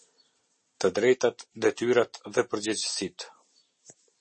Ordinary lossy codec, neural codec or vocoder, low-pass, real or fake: MP3, 32 kbps; none; 10.8 kHz; real